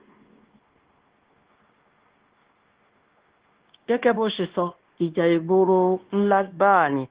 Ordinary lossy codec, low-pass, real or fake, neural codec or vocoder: Opus, 16 kbps; 3.6 kHz; fake; codec, 16 kHz, 0.9 kbps, LongCat-Audio-Codec